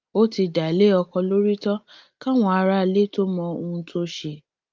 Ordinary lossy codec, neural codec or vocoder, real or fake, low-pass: Opus, 32 kbps; none; real; 7.2 kHz